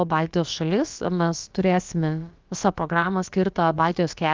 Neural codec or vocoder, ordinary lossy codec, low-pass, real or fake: codec, 16 kHz, about 1 kbps, DyCAST, with the encoder's durations; Opus, 24 kbps; 7.2 kHz; fake